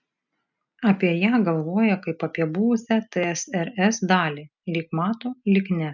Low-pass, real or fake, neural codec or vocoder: 7.2 kHz; real; none